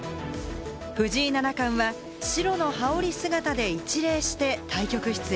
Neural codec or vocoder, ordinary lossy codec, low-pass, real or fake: none; none; none; real